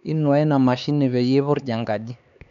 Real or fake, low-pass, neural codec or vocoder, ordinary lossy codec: fake; 7.2 kHz; codec, 16 kHz, 4 kbps, X-Codec, HuBERT features, trained on LibriSpeech; none